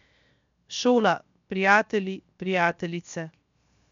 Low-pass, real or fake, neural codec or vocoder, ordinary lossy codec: 7.2 kHz; fake; codec, 16 kHz, 0.7 kbps, FocalCodec; MP3, 64 kbps